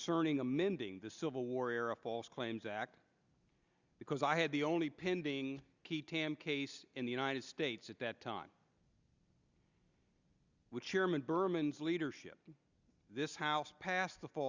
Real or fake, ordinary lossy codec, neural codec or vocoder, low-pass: real; Opus, 64 kbps; none; 7.2 kHz